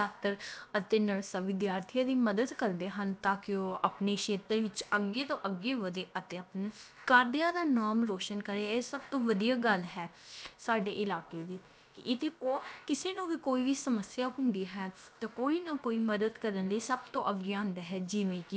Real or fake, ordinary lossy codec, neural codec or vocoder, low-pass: fake; none; codec, 16 kHz, about 1 kbps, DyCAST, with the encoder's durations; none